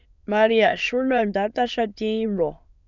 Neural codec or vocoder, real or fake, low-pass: autoencoder, 22.05 kHz, a latent of 192 numbers a frame, VITS, trained on many speakers; fake; 7.2 kHz